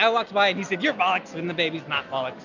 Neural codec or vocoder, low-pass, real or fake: none; 7.2 kHz; real